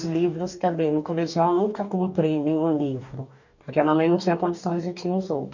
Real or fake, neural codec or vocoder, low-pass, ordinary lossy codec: fake; codec, 44.1 kHz, 2.6 kbps, DAC; 7.2 kHz; none